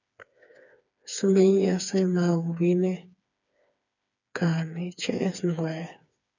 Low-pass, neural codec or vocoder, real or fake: 7.2 kHz; codec, 16 kHz, 4 kbps, FreqCodec, smaller model; fake